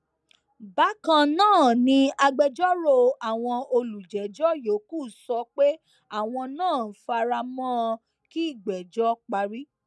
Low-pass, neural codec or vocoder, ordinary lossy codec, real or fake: none; none; none; real